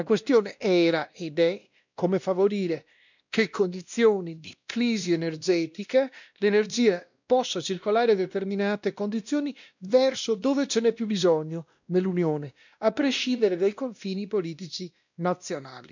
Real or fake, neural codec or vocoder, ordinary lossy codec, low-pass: fake; codec, 16 kHz, 1 kbps, X-Codec, WavLM features, trained on Multilingual LibriSpeech; none; 7.2 kHz